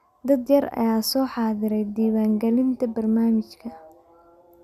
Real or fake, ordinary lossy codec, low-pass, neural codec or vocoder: real; none; 14.4 kHz; none